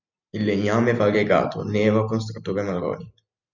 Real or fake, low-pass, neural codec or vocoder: real; 7.2 kHz; none